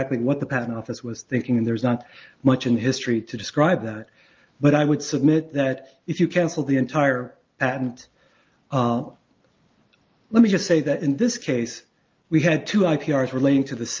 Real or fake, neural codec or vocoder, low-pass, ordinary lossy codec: real; none; 7.2 kHz; Opus, 24 kbps